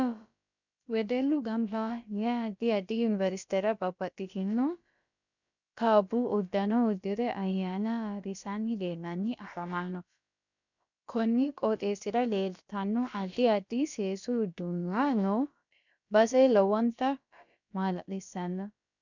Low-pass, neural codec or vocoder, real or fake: 7.2 kHz; codec, 16 kHz, about 1 kbps, DyCAST, with the encoder's durations; fake